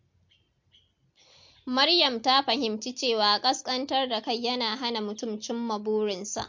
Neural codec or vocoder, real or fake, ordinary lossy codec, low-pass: none; real; MP3, 48 kbps; 7.2 kHz